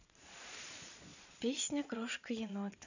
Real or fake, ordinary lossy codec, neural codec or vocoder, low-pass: fake; none; vocoder, 22.05 kHz, 80 mel bands, Vocos; 7.2 kHz